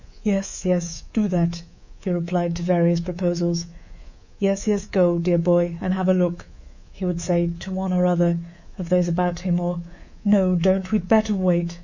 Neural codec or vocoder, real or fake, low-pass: codec, 24 kHz, 3.1 kbps, DualCodec; fake; 7.2 kHz